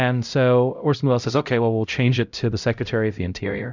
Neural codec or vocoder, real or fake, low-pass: codec, 16 kHz, 0.5 kbps, X-Codec, HuBERT features, trained on LibriSpeech; fake; 7.2 kHz